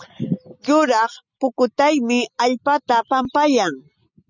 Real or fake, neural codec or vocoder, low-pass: real; none; 7.2 kHz